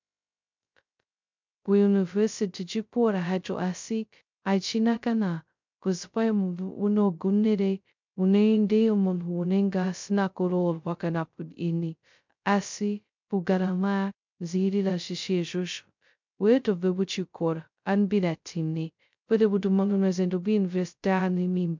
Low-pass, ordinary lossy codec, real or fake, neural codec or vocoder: 7.2 kHz; MP3, 64 kbps; fake; codec, 16 kHz, 0.2 kbps, FocalCodec